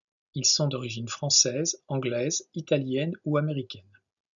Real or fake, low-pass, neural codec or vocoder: real; 7.2 kHz; none